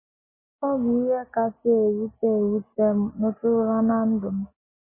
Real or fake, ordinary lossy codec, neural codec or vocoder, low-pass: real; MP3, 16 kbps; none; 3.6 kHz